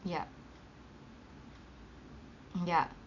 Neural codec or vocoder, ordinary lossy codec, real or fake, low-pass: none; none; real; 7.2 kHz